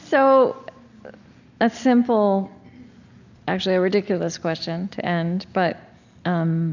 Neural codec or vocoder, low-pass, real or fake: none; 7.2 kHz; real